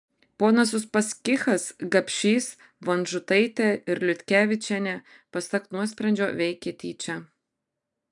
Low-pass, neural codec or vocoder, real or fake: 10.8 kHz; none; real